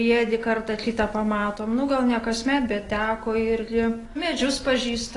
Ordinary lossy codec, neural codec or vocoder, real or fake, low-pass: AAC, 32 kbps; none; real; 10.8 kHz